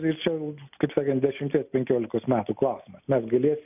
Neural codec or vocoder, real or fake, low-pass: none; real; 3.6 kHz